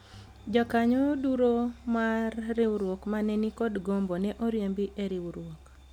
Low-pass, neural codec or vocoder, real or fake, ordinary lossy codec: 19.8 kHz; none; real; none